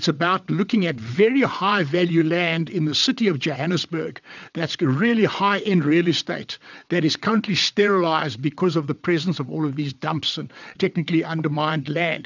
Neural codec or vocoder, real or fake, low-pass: vocoder, 22.05 kHz, 80 mel bands, WaveNeXt; fake; 7.2 kHz